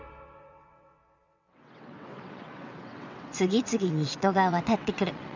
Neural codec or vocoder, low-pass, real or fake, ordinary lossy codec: vocoder, 22.05 kHz, 80 mel bands, WaveNeXt; 7.2 kHz; fake; none